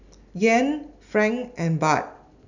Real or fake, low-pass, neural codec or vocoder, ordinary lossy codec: real; 7.2 kHz; none; none